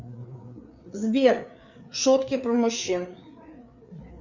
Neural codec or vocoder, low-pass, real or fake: codec, 16 kHz, 4 kbps, FreqCodec, larger model; 7.2 kHz; fake